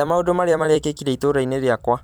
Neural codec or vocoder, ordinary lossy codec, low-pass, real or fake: vocoder, 44.1 kHz, 128 mel bands every 256 samples, BigVGAN v2; none; none; fake